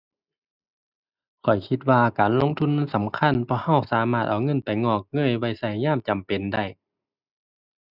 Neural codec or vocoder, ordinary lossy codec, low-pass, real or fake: none; none; 5.4 kHz; real